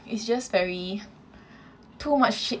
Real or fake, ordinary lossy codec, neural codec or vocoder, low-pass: real; none; none; none